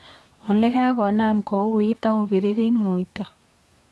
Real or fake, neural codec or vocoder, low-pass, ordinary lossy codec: fake; codec, 24 kHz, 1 kbps, SNAC; none; none